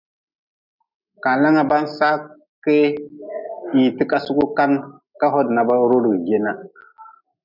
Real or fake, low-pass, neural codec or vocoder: real; 5.4 kHz; none